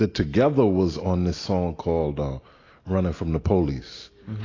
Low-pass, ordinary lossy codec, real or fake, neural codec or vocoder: 7.2 kHz; AAC, 32 kbps; real; none